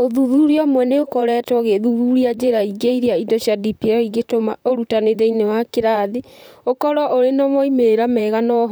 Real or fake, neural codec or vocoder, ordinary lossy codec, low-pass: fake; vocoder, 44.1 kHz, 128 mel bands, Pupu-Vocoder; none; none